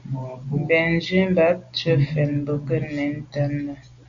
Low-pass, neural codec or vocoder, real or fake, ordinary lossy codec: 7.2 kHz; none; real; AAC, 64 kbps